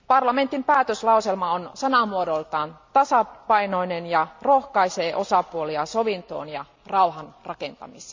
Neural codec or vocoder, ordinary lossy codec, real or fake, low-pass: none; MP3, 64 kbps; real; 7.2 kHz